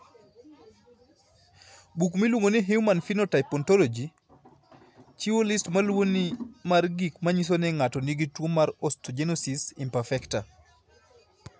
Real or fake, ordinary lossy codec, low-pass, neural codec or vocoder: real; none; none; none